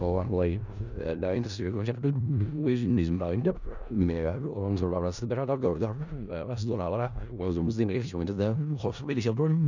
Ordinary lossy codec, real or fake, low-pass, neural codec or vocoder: none; fake; 7.2 kHz; codec, 16 kHz in and 24 kHz out, 0.4 kbps, LongCat-Audio-Codec, four codebook decoder